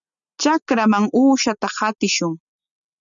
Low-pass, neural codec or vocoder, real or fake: 7.2 kHz; none; real